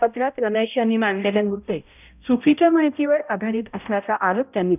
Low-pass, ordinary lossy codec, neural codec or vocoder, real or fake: 3.6 kHz; none; codec, 16 kHz, 0.5 kbps, X-Codec, HuBERT features, trained on balanced general audio; fake